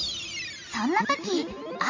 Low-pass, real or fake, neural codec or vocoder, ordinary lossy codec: 7.2 kHz; real; none; MP3, 32 kbps